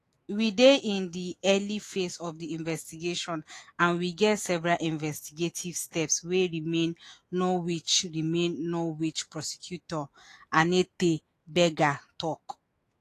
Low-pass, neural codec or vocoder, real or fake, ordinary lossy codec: 14.4 kHz; autoencoder, 48 kHz, 128 numbers a frame, DAC-VAE, trained on Japanese speech; fake; AAC, 48 kbps